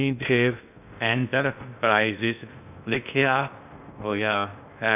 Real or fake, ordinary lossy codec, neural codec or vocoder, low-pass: fake; none; codec, 16 kHz in and 24 kHz out, 0.6 kbps, FocalCodec, streaming, 2048 codes; 3.6 kHz